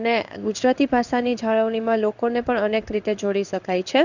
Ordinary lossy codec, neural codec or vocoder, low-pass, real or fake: none; codec, 16 kHz in and 24 kHz out, 1 kbps, XY-Tokenizer; 7.2 kHz; fake